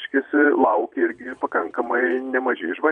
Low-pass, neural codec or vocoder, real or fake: 9.9 kHz; vocoder, 22.05 kHz, 80 mel bands, Vocos; fake